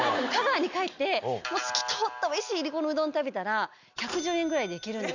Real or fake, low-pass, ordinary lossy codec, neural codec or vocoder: real; 7.2 kHz; none; none